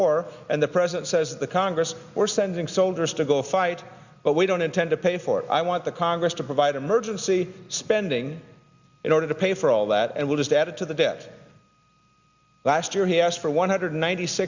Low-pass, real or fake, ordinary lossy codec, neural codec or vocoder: 7.2 kHz; real; Opus, 64 kbps; none